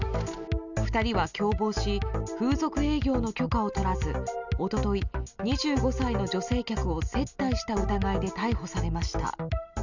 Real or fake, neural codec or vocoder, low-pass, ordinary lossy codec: real; none; 7.2 kHz; none